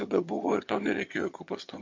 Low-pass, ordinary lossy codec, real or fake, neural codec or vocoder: 7.2 kHz; MP3, 48 kbps; fake; vocoder, 22.05 kHz, 80 mel bands, HiFi-GAN